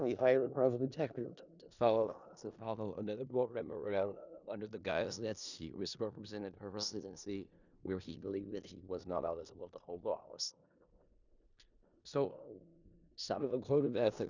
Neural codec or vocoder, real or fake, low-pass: codec, 16 kHz in and 24 kHz out, 0.4 kbps, LongCat-Audio-Codec, four codebook decoder; fake; 7.2 kHz